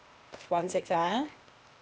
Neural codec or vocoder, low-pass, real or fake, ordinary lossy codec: codec, 16 kHz, 0.8 kbps, ZipCodec; none; fake; none